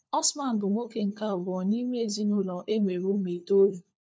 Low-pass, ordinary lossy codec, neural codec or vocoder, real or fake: none; none; codec, 16 kHz, 4 kbps, FunCodec, trained on LibriTTS, 50 frames a second; fake